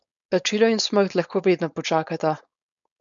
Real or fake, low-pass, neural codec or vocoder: fake; 7.2 kHz; codec, 16 kHz, 4.8 kbps, FACodec